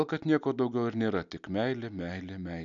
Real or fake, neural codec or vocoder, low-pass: real; none; 7.2 kHz